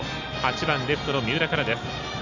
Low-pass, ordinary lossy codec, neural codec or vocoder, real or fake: 7.2 kHz; none; none; real